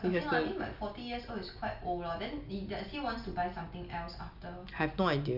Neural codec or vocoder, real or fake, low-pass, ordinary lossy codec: none; real; 5.4 kHz; none